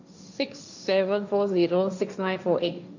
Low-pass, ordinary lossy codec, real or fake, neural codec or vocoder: 7.2 kHz; none; fake; codec, 16 kHz, 1.1 kbps, Voila-Tokenizer